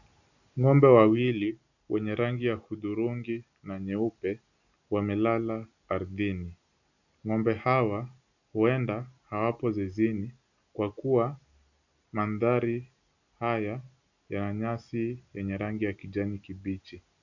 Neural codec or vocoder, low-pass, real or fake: none; 7.2 kHz; real